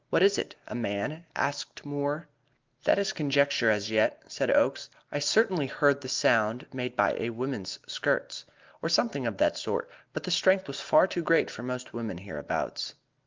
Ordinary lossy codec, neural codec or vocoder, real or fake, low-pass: Opus, 24 kbps; none; real; 7.2 kHz